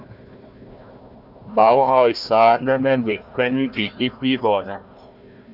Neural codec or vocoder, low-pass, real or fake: codec, 16 kHz, 1 kbps, FunCodec, trained on Chinese and English, 50 frames a second; 5.4 kHz; fake